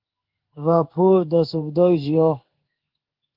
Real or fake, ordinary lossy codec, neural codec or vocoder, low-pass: fake; Opus, 24 kbps; codec, 16 kHz in and 24 kHz out, 1 kbps, XY-Tokenizer; 5.4 kHz